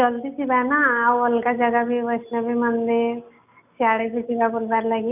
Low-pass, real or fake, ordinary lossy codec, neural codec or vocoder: 3.6 kHz; real; none; none